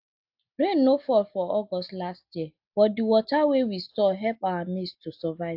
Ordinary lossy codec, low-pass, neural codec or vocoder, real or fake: AAC, 48 kbps; 5.4 kHz; none; real